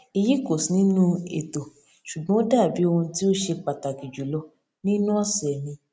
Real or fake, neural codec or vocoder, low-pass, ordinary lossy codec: real; none; none; none